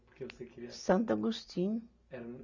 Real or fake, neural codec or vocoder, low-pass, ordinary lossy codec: real; none; 7.2 kHz; none